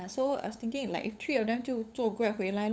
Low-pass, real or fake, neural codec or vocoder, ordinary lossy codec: none; fake; codec, 16 kHz, 8 kbps, FunCodec, trained on LibriTTS, 25 frames a second; none